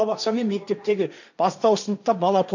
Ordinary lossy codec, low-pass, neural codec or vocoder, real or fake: none; 7.2 kHz; codec, 16 kHz, 1.1 kbps, Voila-Tokenizer; fake